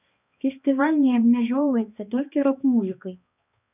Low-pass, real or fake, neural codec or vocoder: 3.6 kHz; fake; codec, 16 kHz, 2 kbps, X-Codec, HuBERT features, trained on balanced general audio